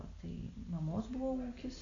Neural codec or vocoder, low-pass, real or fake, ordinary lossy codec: none; 7.2 kHz; real; MP3, 64 kbps